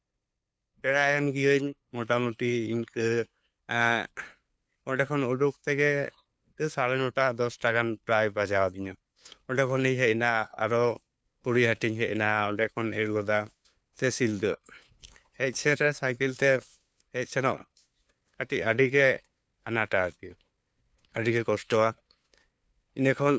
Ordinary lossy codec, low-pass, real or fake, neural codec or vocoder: none; none; fake; codec, 16 kHz, 2 kbps, FunCodec, trained on LibriTTS, 25 frames a second